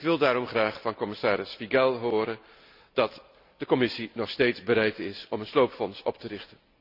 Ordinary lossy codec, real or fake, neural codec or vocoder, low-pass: none; real; none; 5.4 kHz